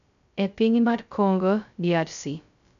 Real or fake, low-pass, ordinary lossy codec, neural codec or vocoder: fake; 7.2 kHz; none; codec, 16 kHz, 0.2 kbps, FocalCodec